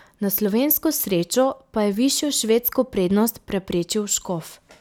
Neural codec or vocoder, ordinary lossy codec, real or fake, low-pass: none; none; real; none